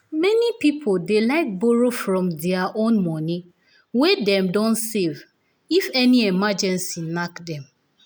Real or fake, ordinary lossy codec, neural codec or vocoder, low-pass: real; none; none; none